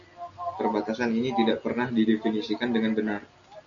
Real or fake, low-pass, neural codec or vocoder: real; 7.2 kHz; none